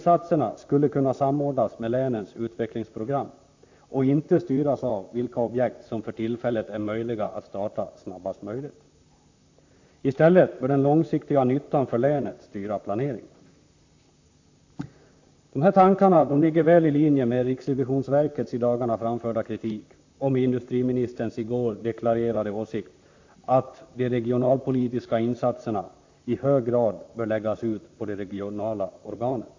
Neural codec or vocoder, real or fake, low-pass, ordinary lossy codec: vocoder, 44.1 kHz, 128 mel bands, Pupu-Vocoder; fake; 7.2 kHz; AAC, 48 kbps